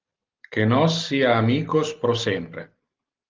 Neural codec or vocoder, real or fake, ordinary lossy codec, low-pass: none; real; Opus, 32 kbps; 7.2 kHz